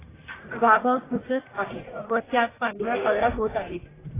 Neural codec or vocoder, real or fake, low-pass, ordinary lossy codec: codec, 44.1 kHz, 1.7 kbps, Pupu-Codec; fake; 3.6 kHz; AAC, 16 kbps